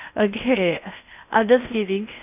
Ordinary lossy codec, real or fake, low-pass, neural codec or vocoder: none; fake; 3.6 kHz; codec, 16 kHz in and 24 kHz out, 0.6 kbps, FocalCodec, streaming, 4096 codes